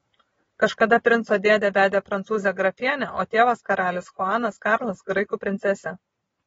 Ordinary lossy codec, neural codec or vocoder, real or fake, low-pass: AAC, 24 kbps; none; real; 19.8 kHz